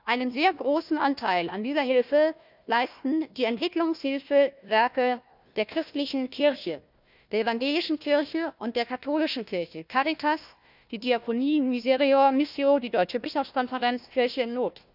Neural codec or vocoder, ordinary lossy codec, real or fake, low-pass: codec, 16 kHz, 1 kbps, FunCodec, trained on Chinese and English, 50 frames a second; none; fake; 5.4 kHz